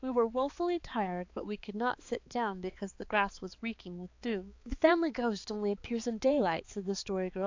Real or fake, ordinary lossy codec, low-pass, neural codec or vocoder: fake; AAC, 48 kbps; 7.2 kHz; codec, 16 kHz, 4 kbps, X-Codec, HuBERT features, trained on balanced general audio